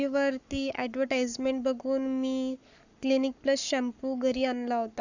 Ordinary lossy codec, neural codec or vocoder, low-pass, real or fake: none; codec, 44.1 kHz, 7.8 kbps, Pupu-Codec; 7.2 kHz; fake